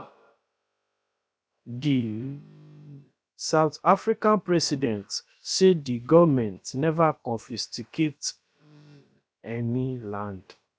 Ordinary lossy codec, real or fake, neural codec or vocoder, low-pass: none; fake; codec, 16 kHz, about 1 kbps, DyCAST, with the encoder's durations; none